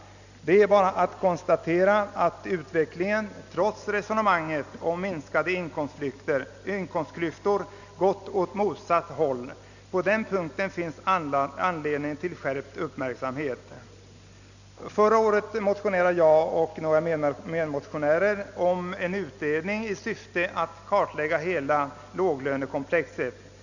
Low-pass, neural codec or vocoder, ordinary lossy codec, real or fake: 7.2 kHz; none; none; real